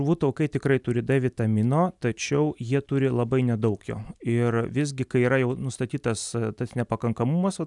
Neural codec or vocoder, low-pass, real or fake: none; 10.8 kHz; real